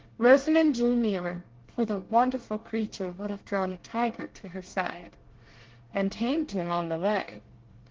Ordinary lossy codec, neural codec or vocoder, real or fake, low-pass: Opus, 16 kbps; codec, 24 kHz, 1 kbps, SNAC; fake; 7.2 kHz